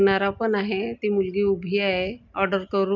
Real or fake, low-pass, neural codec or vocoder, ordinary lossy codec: real; 7.2 kHz; none; none